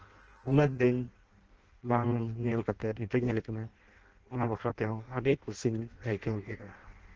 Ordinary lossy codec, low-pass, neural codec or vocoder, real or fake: Opus, 16 kbps; 7.2 kHz; codec, 16 kHz in and 24 kHz out, 0.6 kbps, FireRedTTS-2 codec; fake